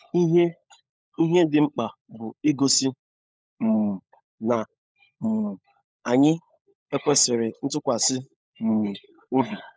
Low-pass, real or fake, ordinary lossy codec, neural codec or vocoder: none; fake; none; codec, 16 kHz, 16 kbps, FunCodec, trained on LibriTTS, 50 frames a second